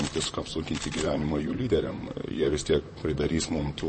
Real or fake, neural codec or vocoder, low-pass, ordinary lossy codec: fake; vocoder, 44.1 kHz, 128 mel bands, Pupu-Vocoder; 10.8 kHz; MP3, 32 kbps